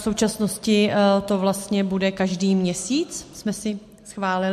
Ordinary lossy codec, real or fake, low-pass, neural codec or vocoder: MP3, 64 kbps; real; 14.4 kHz; none